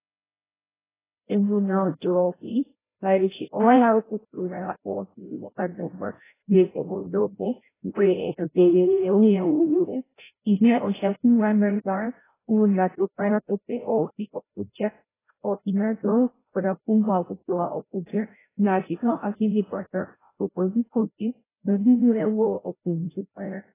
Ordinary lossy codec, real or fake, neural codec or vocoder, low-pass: AAC, 16 kbps; fake; codec, 16 kHz, 0.5 kbps, FreqCodec, larger model; 3.6 kHz